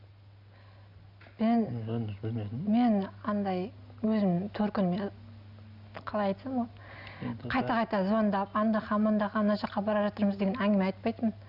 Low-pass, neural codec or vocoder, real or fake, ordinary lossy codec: 5.4 kHz; none; real; none